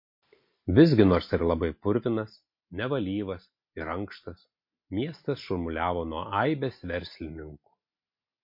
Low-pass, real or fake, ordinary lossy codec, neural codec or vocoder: 5.4 kHz; real; MP3, 32 kbps; none